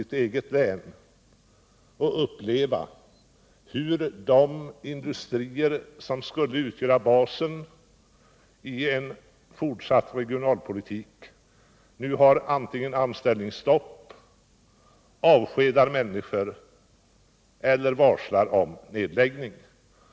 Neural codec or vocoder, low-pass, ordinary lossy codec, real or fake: none; none; none; real